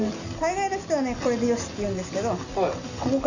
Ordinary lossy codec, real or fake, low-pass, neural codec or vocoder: none; real; 7.2 kHz; none